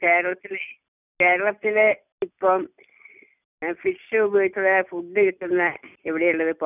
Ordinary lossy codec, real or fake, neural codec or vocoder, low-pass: none; real; none; 3.6 kHz